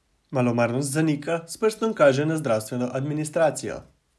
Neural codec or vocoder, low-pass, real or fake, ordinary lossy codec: none; none; real; none